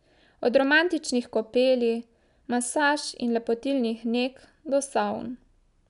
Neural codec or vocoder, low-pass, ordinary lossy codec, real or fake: none; 10.8 kHz; none; real